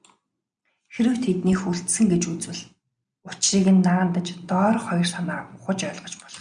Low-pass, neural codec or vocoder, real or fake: 9.9 kHz; none; real